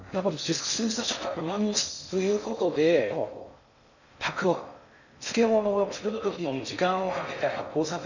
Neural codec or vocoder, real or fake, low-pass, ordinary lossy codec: codec, 16 kHz in and 24 kHz out, 0.6 kbps, FocalCodec, streaming, 2048 codes; fake; 7.2 kHz; none